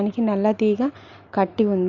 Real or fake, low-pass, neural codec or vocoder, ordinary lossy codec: real; 7.2 kHz; none; Opus, 64 kbps